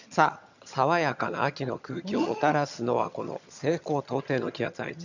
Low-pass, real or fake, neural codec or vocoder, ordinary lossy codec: 7.2 kHz; fake; vocoder, 22.05 kHz, 80 mel bands, HiFi-GAN; none